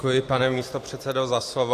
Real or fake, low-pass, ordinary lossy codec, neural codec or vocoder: fake; 14.4 kHz; AAC, 64 kbps; vocoder, 44.1 kHz, 128 mel bands every 256 samples, BigVGAN v2